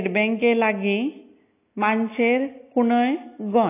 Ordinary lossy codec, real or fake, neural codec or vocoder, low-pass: AAC, 24 kbps; real; none; 3.6 kHz